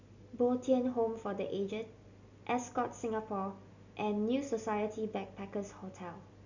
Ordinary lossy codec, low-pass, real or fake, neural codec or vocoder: none; 7.2 kHz; real; none